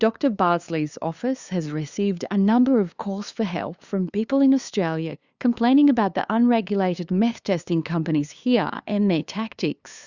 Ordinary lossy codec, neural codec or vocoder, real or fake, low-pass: Opus, 64 kbps; codec, 16 kHz, 2 kbps, FunCodec, trained on LibriTTS, 25 frames a second; fake; 7.2 kHz